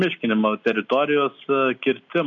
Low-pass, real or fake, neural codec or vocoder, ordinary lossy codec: 7.2 kHz; real; none; AAC, 64 kbps